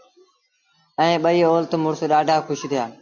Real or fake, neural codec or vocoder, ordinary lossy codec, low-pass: real; none; AAC, 48 kbps; 7.2 kHz